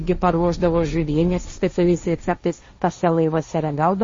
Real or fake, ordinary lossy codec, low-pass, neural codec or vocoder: fake; MP3, 32 kbps; 7.2 kHz; codec, 16 kHz, 1.1 kbps, Voila-Tokenizer